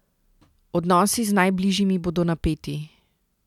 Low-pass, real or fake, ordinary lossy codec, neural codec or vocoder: 19.8 kHz; real; none; none